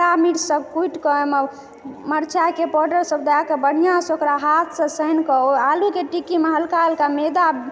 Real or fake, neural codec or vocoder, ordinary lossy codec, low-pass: real; none; none; none